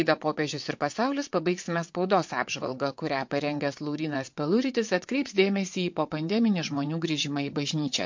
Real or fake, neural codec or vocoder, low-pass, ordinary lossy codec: fake; vocoder, 22.05 kHz, 80 mel bands, WaveNeXt; 7.2 kHz; MP3, 48 kbps